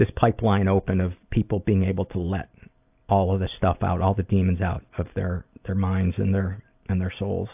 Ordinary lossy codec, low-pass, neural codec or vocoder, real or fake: AAC, 32 kbps; 3.6 kHz; none; real